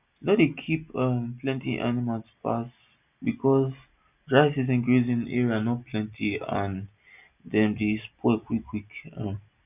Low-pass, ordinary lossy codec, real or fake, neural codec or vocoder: 3.6 kHz; none; real; none